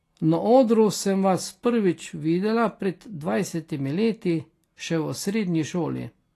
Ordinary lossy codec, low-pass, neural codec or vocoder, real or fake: AAC, 48 kbps; 14.4 kHz; none; real